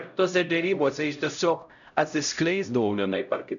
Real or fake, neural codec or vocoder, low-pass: fake; codec, 16 kHz, 0.5 kbps, X-Codec, HuBERT features, trained on LibriSpeech; 7.2 kHz